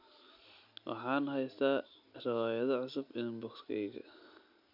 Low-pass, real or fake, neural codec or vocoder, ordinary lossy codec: 5.4 kHz; real; none; none